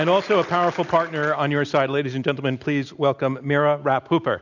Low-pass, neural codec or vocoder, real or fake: 7.2 kHz; none; real